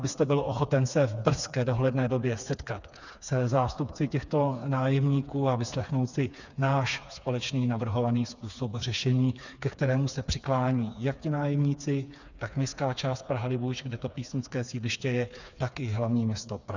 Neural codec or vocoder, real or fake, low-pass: codec, 16 kHz, 4 kbps, FreqCodec, smaller model; fake; 7.2 kHz